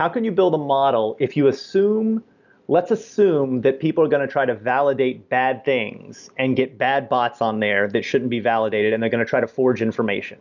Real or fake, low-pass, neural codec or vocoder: real; 7.2 kHz; none